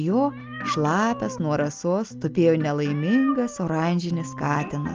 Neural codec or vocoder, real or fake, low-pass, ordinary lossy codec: none; real; 7.2 kHz; Opus, 32 kbps